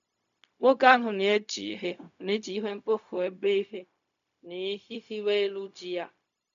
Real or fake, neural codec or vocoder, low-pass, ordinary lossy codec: fake; codec, 16 kHz, 0.4 kbps, LongCat-Audio-Codec; 7.2 kHz; none